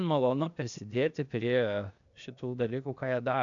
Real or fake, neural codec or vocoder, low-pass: fake; codec, 16 kHz, 0.8 kbps, ZipCodec; 7.2 kHz